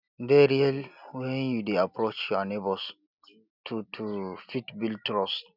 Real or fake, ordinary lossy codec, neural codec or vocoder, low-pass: real; none; none; 5.4 kHz